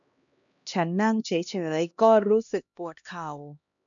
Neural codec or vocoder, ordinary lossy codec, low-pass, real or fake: codec, 16 kHz, 1 kbps, X-Codec, HuBERT features, trained on LibriSpeech; none; 7.2 kHz; fake